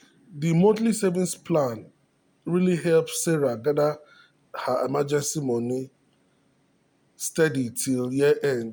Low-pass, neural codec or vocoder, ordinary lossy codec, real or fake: none; none; none; real